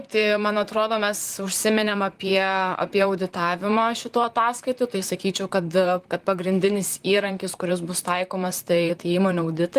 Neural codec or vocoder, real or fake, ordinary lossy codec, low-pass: vocoder, 44.1 kHz, 128 mel bands, Pupu-Vocoder; fake; Opus, 24 kbps; 14.4 kHz